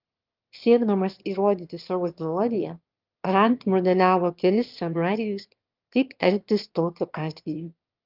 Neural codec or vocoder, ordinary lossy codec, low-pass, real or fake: autoencoder, 22.05 kHz, a latent of 192 numbers a frame, VITS, trained on one speaker; Opus, 24 kbps; 5.4 kHz; fake